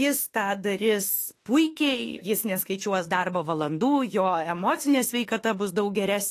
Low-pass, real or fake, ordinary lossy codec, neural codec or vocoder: 14.4 kHz; fake; AAC, 48 kbps; autoencoder, 48 kHz, 32 numbers a frame, DAC-VAE, trained on Japanese speech